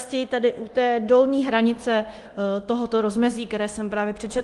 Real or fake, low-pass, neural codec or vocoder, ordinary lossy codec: fake; 10.8 kHz; codec, 24 kHz, 0.9 kbps, DualCodec; Opus, 24 kbps